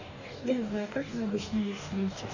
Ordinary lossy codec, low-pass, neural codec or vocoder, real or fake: none; 7.2 kHz; codec, 44.1 kHz, 2.6 kbps, DAC; fake